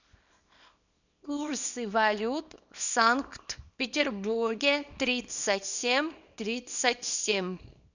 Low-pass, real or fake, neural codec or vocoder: 7.2 kHz; fake; codec, 24 kHz, 0.9 kbps, WavTokenizer, small release